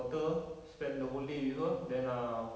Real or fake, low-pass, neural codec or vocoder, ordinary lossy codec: real; none; none; none